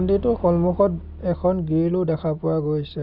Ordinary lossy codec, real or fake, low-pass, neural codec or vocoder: none; real; 5.4 kHz; none